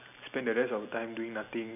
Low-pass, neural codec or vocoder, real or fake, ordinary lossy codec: 3.6 kHz; none; real; none